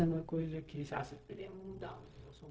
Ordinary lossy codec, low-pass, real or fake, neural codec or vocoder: none; none; fake; codec, 16 kHz, 0.4 kbps, LongCat-Audio-Codec